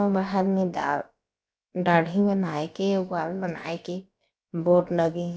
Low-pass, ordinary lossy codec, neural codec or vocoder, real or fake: none; none; codec, 16 kHz, about 1 kbps, DyCAST, with the encoder's durations; fake